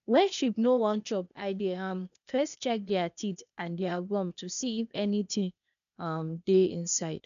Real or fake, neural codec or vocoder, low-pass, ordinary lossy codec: fake; codec, 16 kHz, 0.8 kbps, ZipCodec; 7.2 kHz; none